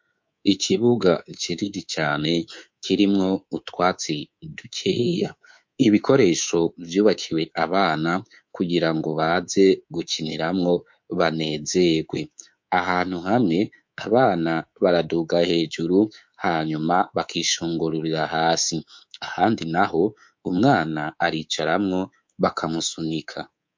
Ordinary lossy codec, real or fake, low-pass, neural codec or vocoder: MP3, 48 kbps; fake; 7.2 kHz; codec, 24 kHz, 3.1 kbps, DualCodec